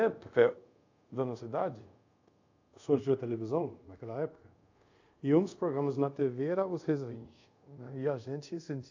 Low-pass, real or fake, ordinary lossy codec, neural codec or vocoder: 7.2 kHz; fake; AAC, 48 kbps; codec, 24 kHz, 0.5 kbps, DualCodec